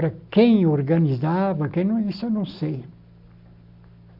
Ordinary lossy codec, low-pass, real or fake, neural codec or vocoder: none; 5.4 kHz; real; none